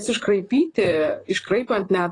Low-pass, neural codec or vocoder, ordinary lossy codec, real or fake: 10.8 kHz; vocoder, 44.1 kHz, 128 mel bands, Pupu-Vocoder; AAC, 32 kbps; fake